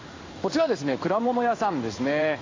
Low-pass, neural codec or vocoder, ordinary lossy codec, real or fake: 7.2 kHz; codec, 16 kHz in and 24 kHz out, 1 kbps, XY-Tokenizer; AAC, 32 kbps; fake